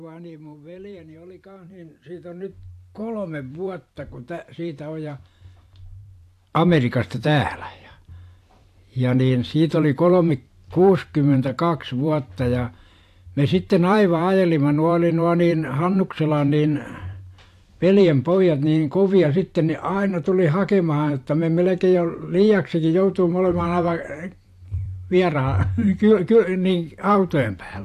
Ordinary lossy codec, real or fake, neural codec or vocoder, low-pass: AAC, 64 kbps; fake; vocoder, 44.1 kHz, 128 mel bands every 512 samples, BigVGAN v2; 14.4 kHz